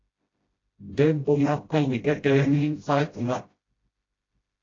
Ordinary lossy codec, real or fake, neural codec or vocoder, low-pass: AAC, 32 kbps; fake; codec, 16 kHz, 0.5 kbps, FreqCodec, smaller model; 7.2 kHz